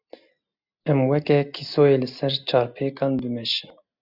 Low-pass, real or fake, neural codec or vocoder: 5.4 kHz; real; none